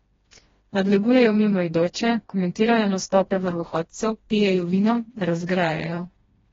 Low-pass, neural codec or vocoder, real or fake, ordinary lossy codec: 7.2 kHz; codec, 16 kHz, 1 kbps, FreqCodec, smaller model; fake; AAC, 24 kbps